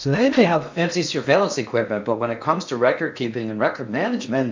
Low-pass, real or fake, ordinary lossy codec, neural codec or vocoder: 7.2 kHz; fake; MP3, 64 kbps; codec, 16 kHz in and 24 kHz out, 0.8 kbps, FocalCodec, streaming, 65536 codes